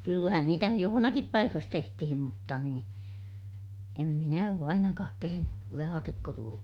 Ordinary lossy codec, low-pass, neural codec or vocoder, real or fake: Opus, 64 kbps; 19.8 kHz; autoencoder, 48 kHz, 32 numbers a frame, DAC-VAE, trained on Japanese speech; fake